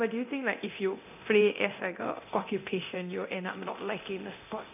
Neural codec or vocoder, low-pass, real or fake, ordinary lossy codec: codec, 24 kHz, 0.9 kbps, DualCodec; 3.6 kHz; fake; none